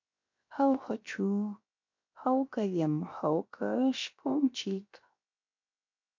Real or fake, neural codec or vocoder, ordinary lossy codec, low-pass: fake; codec, 16 kHz, 0.7 kbps, FocalCodec; MP3, 48 kbps; 7.2 kHz